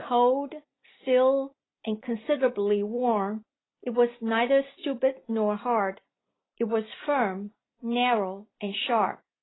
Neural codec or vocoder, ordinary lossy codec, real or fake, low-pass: none; AAC, 16 kbps; real; 7.2 kHz